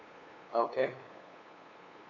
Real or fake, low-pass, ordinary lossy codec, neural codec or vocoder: fake; 7.2 kHz; MP3, 48 kbps; codec, 16 kHz, 8 kbps, FunCodec, trained on LibriTTS, 25 frames a second